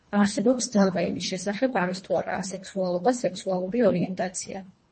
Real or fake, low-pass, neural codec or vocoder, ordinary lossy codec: fake; 10.8 kHz; codec, 24 kHz, 1.5 kbps, HILCodec; MP3, 32 kbps